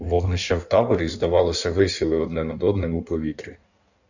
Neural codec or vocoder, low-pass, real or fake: codec, 16 kHz in and 24 kHz out, 1.1 kbps, FireRedTTS-2 codec; 7.2 kHz; fake